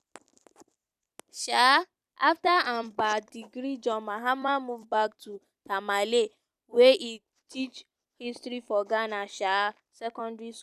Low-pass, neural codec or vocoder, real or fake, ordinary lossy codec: 14.4 kHz; vocoder, 44.1 kHz, 128 mel bands every 256 samples, BigVGAN v2; fake; none